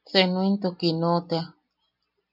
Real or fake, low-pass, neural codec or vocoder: real; 5.4 kHz; none